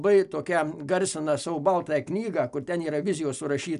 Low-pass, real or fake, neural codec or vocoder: 10.8 kHz; real; none